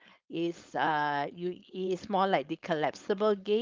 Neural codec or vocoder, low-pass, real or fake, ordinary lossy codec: codec, 16 kHz, 4.8 kbps, FACodec; 7.2 kHz; fake; Opus, 32 kbps